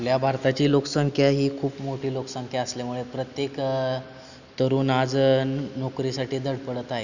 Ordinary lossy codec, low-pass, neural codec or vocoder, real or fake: none; 7.2 kHz; none; real